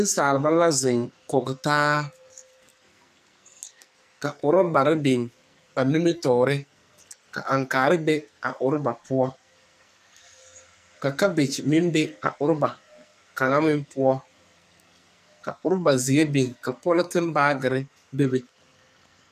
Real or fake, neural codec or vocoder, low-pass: fake; codec, 44.1 kHz, 2.6 kbps, SNAC; 14.4 kHz